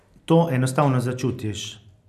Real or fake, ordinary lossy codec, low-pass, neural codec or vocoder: real; none; 14.4 kHz; none